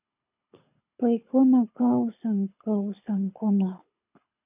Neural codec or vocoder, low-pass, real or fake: codec, 24 kHz, 6 kbps, HILCodec; 3.6 kHz; fake